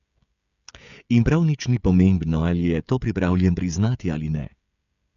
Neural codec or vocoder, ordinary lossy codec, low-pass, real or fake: codec, 16 kHz, 16 kbps, FreqCodec, smaller model; none; 7.2 kHz; fake